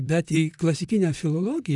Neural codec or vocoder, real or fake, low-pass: vocoder, 44.1 kHz, 128 mel bands, Pupu-Vocoder; fake; 10.8 kHz